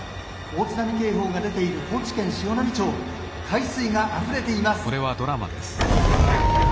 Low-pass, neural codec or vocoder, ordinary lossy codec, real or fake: none; none; none; real